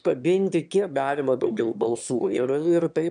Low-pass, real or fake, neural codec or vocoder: 9.9 kHz; fake; autoencoder, 22.05 kHz, a latent of 192 numbers a frame, VITS, trained on one speaker